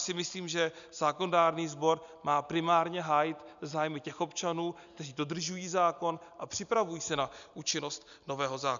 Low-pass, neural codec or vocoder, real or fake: 7.2 kHz; none; real